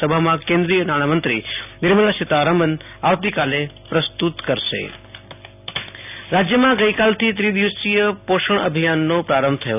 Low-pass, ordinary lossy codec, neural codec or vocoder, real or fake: 3.6 kHz; none; none; real